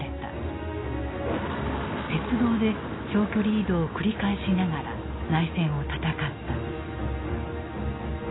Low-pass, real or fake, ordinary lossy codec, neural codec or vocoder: 7.2 kHz; real; AAC, 16 kbps; none